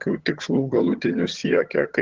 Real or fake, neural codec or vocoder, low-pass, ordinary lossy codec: fake; vocoder, 22.05 kHz, 80 mel bands, HiFi-GAN; 7.2 kHz; Opus, 16 kbps